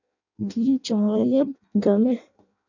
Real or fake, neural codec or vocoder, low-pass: fake; codec, 16 kHz in and 24 kHz out, 0.6 kbps, FireRedTTS-2 codec; 7.2 kHz